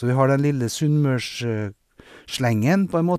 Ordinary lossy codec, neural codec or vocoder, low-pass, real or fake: none; none; 14.4 kHz; real